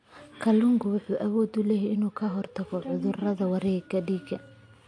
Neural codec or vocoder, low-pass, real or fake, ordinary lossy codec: none; 9.9 kHz; real; MP3, 48 kbps